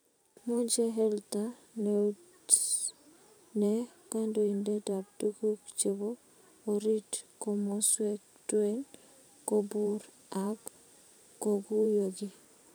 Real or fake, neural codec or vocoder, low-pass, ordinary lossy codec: fake; vocoder, 44.1 kHz, 128 mel bands every 512 samples, BigVGAN v2; none; none